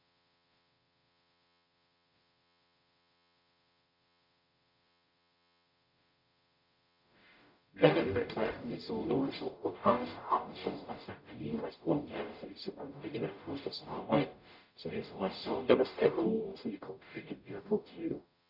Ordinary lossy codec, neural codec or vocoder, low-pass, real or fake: none; codec, 44.1 kHz, 0.9 kbps, DAC; 5.4 kHz; fake